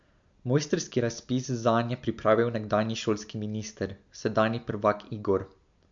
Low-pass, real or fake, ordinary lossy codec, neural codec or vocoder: 7.2 kHz; real; MP3, 64 kbps; none